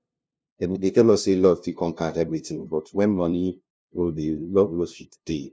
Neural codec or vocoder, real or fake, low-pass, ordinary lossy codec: codec, 16 kHz, 0.5 kbps, FunCodec, trained on LibriTTS, 25 frames a second; fake; none; none